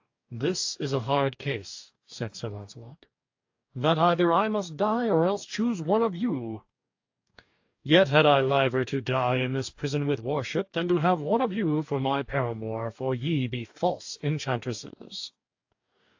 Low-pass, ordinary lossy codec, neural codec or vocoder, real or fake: 7.2 kHz; AAC, 48 kbps; codec, 44.1 kHz, 2.6 kbps, DAC; fake